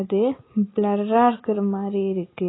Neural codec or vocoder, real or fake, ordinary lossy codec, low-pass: none; real; AAC, 16 kbps; 7.2 kHz